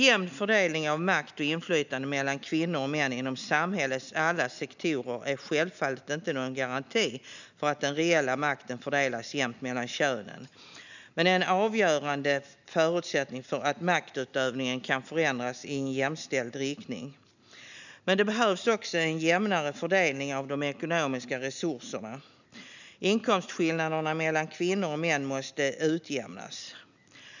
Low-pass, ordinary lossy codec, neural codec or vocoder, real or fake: 7.2 kHz; none; none; real